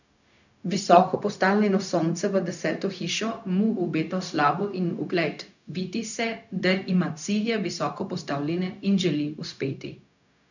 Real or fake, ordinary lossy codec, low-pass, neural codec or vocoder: fake; none; 7.2 kHz; codec, 16 kHz, 0.4 kbps, LongCat-Audio-Codec